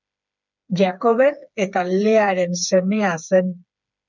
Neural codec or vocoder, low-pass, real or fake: codec, 16 kHz, 4 kbps, FreqCodec, smaller model; 7.2 kHz; fake